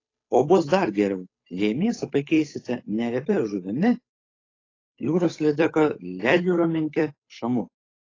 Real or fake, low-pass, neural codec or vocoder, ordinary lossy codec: fake; 7.2 kHz; codec, 16 kHz, 8 kbps, FunCodec, trained on Chinese and English, 25 frames a second; AAC, 32 kbps